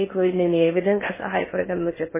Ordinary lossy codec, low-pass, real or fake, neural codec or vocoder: MP3, 16 kbps; 3.6 kHz; fake; codec, 16 kHz in and 24 kHz out, 0.6 kbps, FocalCodec, streaming, 4096 codes